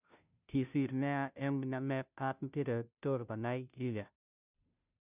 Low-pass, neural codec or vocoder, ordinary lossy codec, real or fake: 3.6 kHz; codec, 16 kHz, 0.5 kbps, FunCodec, trained on Chinese and English, 25 frames a second; none; fake